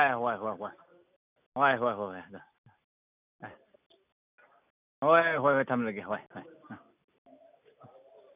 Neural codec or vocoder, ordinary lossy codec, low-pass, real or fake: none; none; 3.6 kHz; real